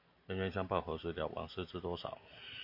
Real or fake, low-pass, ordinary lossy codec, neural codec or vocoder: real; 5.4 kHz; MP3, 32 kbps; none